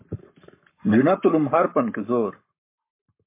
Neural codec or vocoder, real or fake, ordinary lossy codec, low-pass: codec, 44.1 kHz, 7.8 kbps, Pupu-Codec; fake; MP3, 24 kbps; 3.6 kHz